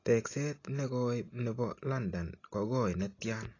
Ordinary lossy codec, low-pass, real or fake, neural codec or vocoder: AAC, 32 kbps; 7.2 kHz; real; none